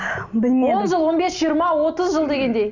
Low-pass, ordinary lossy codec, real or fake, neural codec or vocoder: 7.2 kHz; none; real; none